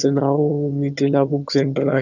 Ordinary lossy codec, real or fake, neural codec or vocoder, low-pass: none; fake; vocoder, 22.05 kHz, 80 mel bands, HiFi-GAN; 7.2 kHz